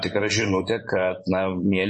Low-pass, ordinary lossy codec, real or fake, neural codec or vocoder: 10.8 kHz; MP3, 32 kbps; fake; vocoder, 24 kHz, 100 mel bands, Vocos